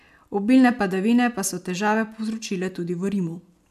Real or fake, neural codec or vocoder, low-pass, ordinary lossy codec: real; none; 14.4 kHz; AAC, 96 kbps